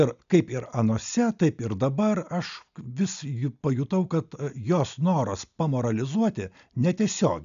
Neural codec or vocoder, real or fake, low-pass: none; real; 7.2 kHz